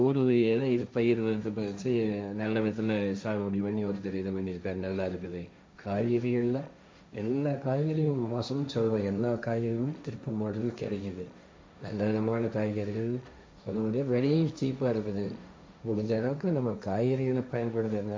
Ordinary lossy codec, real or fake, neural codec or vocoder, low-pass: none; fake; codec, 16 kHz, 1.1 kbps, Voila-Tokenizer; none